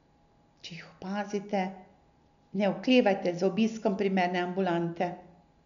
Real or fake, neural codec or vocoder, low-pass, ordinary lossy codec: real; none; 7.2 kHz; none